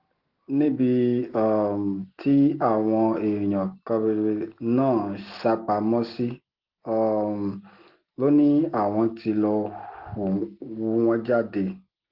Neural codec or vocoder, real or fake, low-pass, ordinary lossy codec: none; real; 5.4 kHz; Opus, 16 kbps